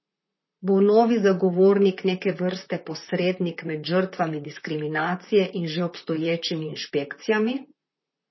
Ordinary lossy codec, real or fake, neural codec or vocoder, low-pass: MP3, 24 kbps; fake; vocoder, 44.1 kHz, 128 mel bands, Pupu-Vocoder; 7.2 kHz